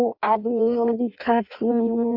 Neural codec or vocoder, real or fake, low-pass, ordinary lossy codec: codec, 16 kHz in and 24 kHz out, 0.6 kbps, FireRedTTS-2 codec; fake; 5.4 kHz; AAC, 48 kbps